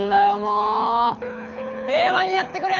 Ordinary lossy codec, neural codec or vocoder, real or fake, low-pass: none; codec, 24 kHz, 6 kbps, HILCodec; fake; 7.2 kHz